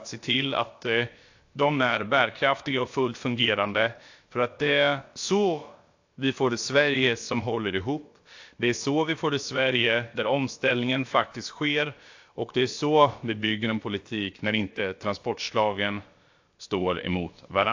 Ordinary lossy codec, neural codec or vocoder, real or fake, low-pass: AAC, 48 kbps; codec, 16 kHz, about 1 kbps, DyCAST, with the encoder's durations; fake; 7.2 kHz